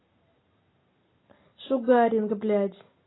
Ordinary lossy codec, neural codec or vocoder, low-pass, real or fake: AAC, 16 kbps; none; 7.2 kHz; real